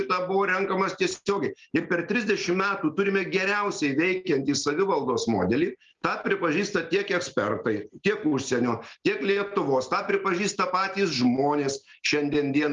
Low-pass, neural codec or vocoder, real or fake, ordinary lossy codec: 7.2 kHz; none; real; Opus, 32 kbps